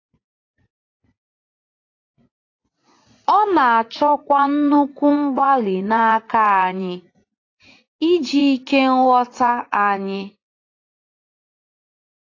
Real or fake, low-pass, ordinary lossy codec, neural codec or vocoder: fake; 7.2 kHz; AAC, 32 kbps; vocoder, 44.1 kHz, 80 mel bands, Vocos